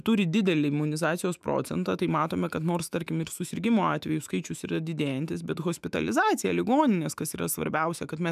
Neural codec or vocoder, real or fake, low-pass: autoencoder, 48 kHz, 128 numbers a frame, DAC-VAE, trained on Japanese speech; fake; 14.4 kHz